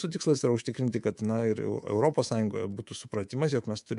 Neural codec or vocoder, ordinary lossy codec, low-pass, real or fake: codec, 24 kHz, 3.1 kbps, DualCodec; MP3, 64 kbps; 10.8 kHz; fake